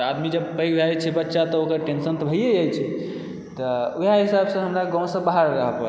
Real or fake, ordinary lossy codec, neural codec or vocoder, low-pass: real; none; none; none